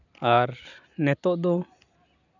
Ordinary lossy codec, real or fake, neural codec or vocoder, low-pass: none; real; none; 7.2 kHz